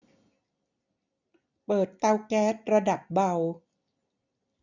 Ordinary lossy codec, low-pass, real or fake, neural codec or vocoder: none; 7.2 kHz; real; none